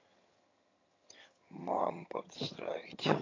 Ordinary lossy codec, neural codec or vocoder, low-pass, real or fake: AAC, 32 kbps; vocoder, 22.05 kHz, 80 mel bands, HiFi-GAN; 7.2 kHz; fake